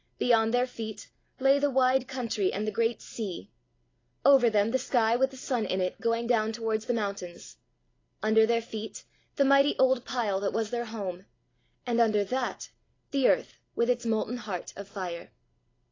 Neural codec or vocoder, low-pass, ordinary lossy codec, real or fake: none; 7.2 kHz; AAC, 32 kbps; real